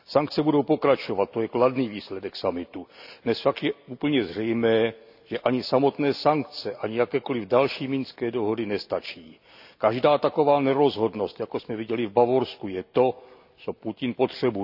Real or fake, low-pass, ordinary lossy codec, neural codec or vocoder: real; 5.4 kHz; none; none